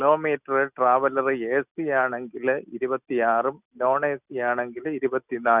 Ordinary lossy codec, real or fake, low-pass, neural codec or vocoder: none; real; 3.6 kHz; none